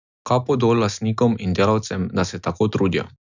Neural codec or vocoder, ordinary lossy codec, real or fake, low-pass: none; none; real; 7.2 kHz